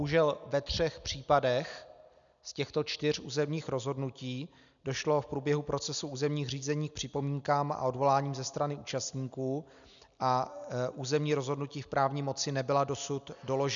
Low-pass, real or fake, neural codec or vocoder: 7.2 kHz; real; none